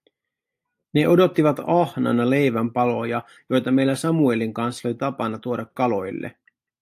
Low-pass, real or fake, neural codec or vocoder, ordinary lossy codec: 14.4 kHz; real; none; AAC, 64 kbps